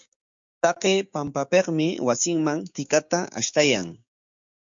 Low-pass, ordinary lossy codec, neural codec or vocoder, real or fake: 7.2 kHz; MP3, 64 kbps; codec, 16 kHz, 6 kbps, DAC; fake